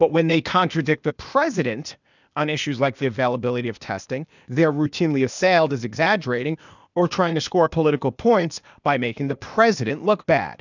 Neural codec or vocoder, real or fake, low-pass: codec, 16 kHz, 0.8 kbps, ZipCodec; fake; 7.2 kHz